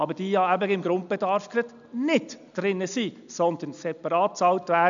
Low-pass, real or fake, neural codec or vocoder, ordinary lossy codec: 7.2 kHz; real; none; none